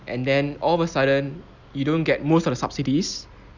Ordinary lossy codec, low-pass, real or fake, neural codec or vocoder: none; 7.2 kHz; real; none